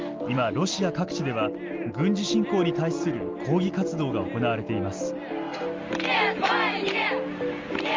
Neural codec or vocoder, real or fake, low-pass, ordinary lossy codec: none; real; 7.2 kHz; Opus, 32 kbps